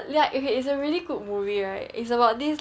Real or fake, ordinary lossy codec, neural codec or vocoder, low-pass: real; none; none; none